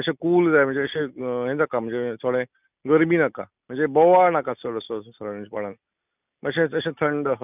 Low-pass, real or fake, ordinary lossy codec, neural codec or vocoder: 3.6 kHz; real; none; none